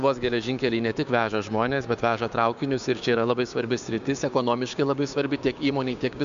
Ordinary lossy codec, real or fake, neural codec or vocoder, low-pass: MP3, 64 kbps; fake; codec, 16 kHz, 6 kbps, DAC; 7.2 kHz